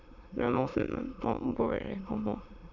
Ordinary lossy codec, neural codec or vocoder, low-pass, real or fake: none; autoencoder, 22.05 kHz, a latent of 192 numbers a frame, VITS, trained on many speakers; 7.2 kHz; fake